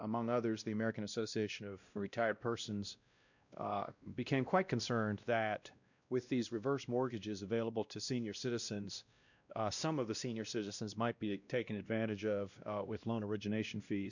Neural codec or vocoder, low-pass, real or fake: codec, 16 kHz, 1 kbps, X-Codec, WavLM features, trained on Multilingual LibriSpeech; 7.2 kHz; fake